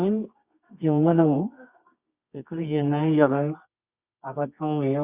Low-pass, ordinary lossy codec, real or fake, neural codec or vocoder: 3.6 kHz; Opus, 64 kbps; fake; codec, 24 kHz, 0.9 kbps, WavTokenizer, medium music audio release